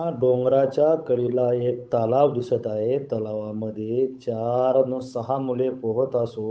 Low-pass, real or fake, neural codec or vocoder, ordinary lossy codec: none; fake; codec, 16 kHz, 8 kbps, FunCodec, trained on Chinese and English, 25 frames a second; none